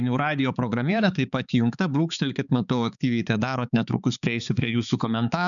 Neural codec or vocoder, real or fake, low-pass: codec, 16 kHz, 4 kbps, X-Codec, HuBERT features, trained on balanced general audio; fake; 7.2 kHz